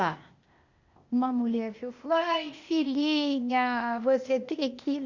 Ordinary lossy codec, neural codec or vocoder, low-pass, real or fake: Opus, 64 kbps; codec, 16 kHz, 0.8 kbps, ZipCodec; 7.2 kHz; fake